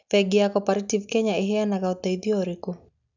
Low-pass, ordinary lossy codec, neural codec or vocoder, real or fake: 7.2 kHz; none; none; real